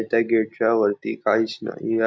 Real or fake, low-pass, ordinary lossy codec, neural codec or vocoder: real; none; none; none